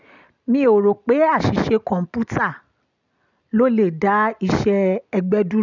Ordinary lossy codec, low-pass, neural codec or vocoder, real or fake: none; 7.2 kHz; none; real